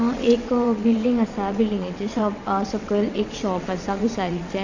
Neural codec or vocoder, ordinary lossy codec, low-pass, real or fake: vocoder, 22.05 kHz, 80 mel bands, WaveNeXt; none; 7.2 kHz; fake